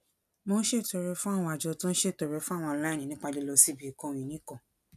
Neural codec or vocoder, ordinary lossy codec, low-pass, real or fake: none; none; 14.4 kHz; real